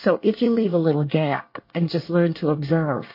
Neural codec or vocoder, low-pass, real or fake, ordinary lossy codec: codec, 24 kHz, 1 kbps, SNAC; 5.4 kHz; fake; MP3, 32 kbps